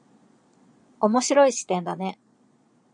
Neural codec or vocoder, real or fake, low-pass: vocoder, 22.05 kHz, 80 mel bands, Vocos; fake; 9.9 kHz